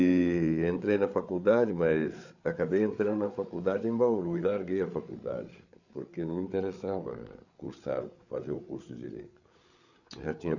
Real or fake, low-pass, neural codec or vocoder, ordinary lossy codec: fake; 7.2 kHz; codec, 16 kHz, 8 kbps, FreqCodec, larger model; none